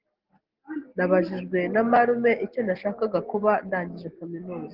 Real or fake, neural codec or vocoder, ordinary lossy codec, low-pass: real; none; Opus, 16 kbps; 5.4 kHz